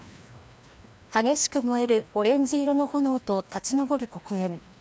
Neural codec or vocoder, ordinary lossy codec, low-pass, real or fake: codec, 16 kHz, 1 kbps, FreqCodec, larger model; none; none; fake